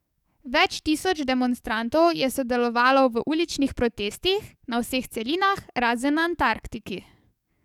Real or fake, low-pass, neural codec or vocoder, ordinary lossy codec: fake; 19.8 kHz; codec, 44.1 kHz, 7.8 kbps, DAC; none